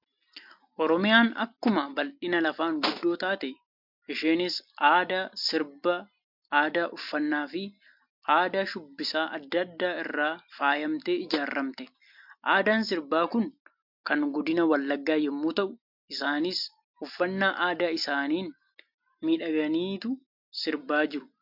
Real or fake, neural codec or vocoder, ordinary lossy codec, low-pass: real; none; MP3, 48 kbps; 5.4 kHz